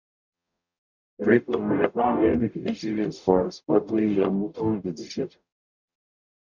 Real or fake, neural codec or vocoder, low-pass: fake; codec, 44.1 kHz, 0.9 kbps, DAC; 7.2 kHz